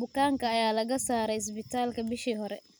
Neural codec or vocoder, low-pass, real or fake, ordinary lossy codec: none; none; real; none